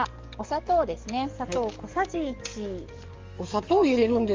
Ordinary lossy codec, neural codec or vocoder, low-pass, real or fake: Opus, 32 kbps; codec, 44.1 kHz, 7.8 kbps, DAC; 7.2 kHz; fake